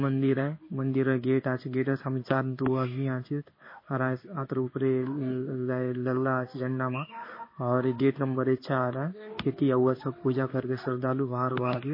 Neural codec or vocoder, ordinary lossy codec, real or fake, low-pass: codec, 16 kHz in and 24 kHz out, 1 kbps, XY-Tokenizer; MP3, 24 kbps; fake; 5.4 kHz